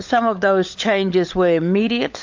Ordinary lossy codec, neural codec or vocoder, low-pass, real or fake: MP3, 48 kbps; codec, 16 kHz, 16 kbps, FunCodec, trained on LibriTTS, 50 frames a second; 7.2 kHz; fake